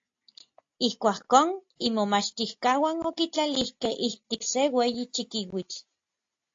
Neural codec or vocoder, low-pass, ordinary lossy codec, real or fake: none; 7.2 kHz; AAC, 48 kbps; real